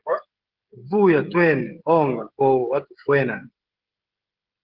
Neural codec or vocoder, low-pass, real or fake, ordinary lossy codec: codec, 16 kHz, 16 kbps, FreqCodec, smaller model; 5.4 kHz; fake; Opus, 16 kbps